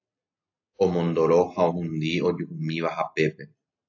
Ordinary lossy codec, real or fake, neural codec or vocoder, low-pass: AAC, 48 kbps; real; none; 7.2 kHz